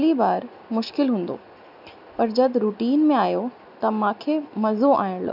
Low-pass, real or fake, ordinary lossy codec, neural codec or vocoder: 5.4 kHz; real; none; none